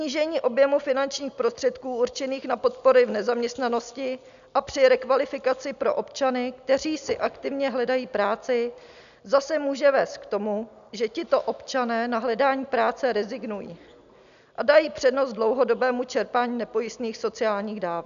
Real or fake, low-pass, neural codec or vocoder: real; 7.2 kHz; none